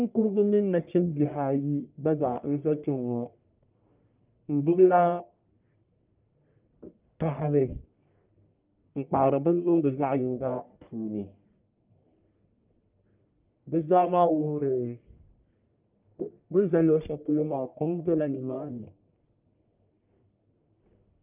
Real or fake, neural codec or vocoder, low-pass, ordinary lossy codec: fake; codec, 44.1 kHz, 1.7 kbps, Pupu-Codec; 3.6 kHz; Opus, 24 kbps